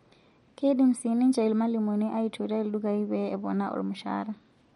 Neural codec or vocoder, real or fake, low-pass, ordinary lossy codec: none; real; 14.4 kHz; MP3, 48 kbps